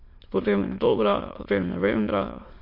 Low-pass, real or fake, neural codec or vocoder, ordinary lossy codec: 5.4 kHz; fake; autoencoder, 22.05 kHz, a latent of 192 numbers a frame, VITS, trained on many speakers; MP3, 32 kbps